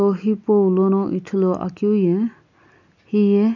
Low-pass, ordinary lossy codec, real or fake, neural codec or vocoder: 7.2 kHz; none; real; none